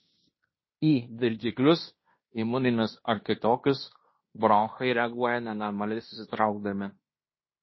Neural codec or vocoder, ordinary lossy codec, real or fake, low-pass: codec, 16 kHz in and 24 kHz out, 0.9 kbps, LongCat-Audio-Codec, fine tuned four codebook decoder; MP3, 24 kbps; fake; 7.2 kHz